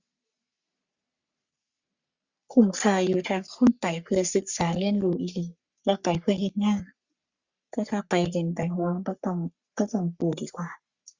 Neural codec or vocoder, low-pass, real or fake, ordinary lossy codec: codec, 44.1 kHz, 3.4 kbps, Pupu-Codec; 7.2 kHz; fake; Opus, 64 kbps